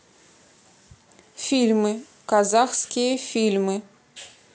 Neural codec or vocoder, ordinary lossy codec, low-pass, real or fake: none; none; none; real